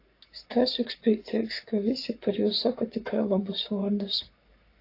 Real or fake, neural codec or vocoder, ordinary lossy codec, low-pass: fake; codec, 44.1 kHz, 7.8 kbps, Pupu-Codec; AAC, 32 kbps; 5.4 kHz